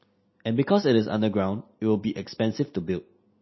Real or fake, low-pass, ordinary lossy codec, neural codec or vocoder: real; 7.2 kHz; MP3, 24 kbps; none